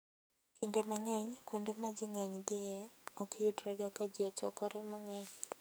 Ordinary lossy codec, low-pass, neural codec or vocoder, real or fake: none; none; codec, 44.1 kHz, 2.6 kbps, SNAC; fake